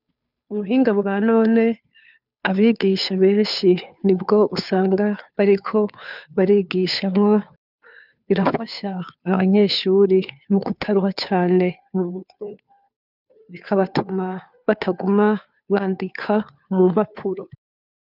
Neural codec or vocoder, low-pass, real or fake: codec, 16 kHz, 2 kbps, FunCodec, trained on Chinese and English, 25 frames a second; 5.4 kHz; fake